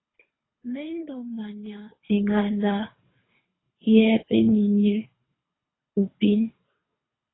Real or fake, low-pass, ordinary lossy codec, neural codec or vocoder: fake; 7.2 kHz; AAC, 16 kbps; codec, 24 kHz, 3 kbps, HILCodec